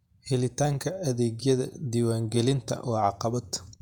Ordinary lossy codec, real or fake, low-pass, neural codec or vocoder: none; fake; 19.8 kHz; vocoder, 48 kHz, 128 mel bands, Vocos